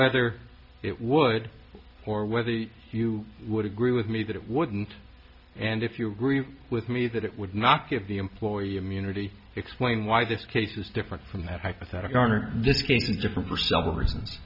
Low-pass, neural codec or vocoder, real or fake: 5.4 kHz; none; real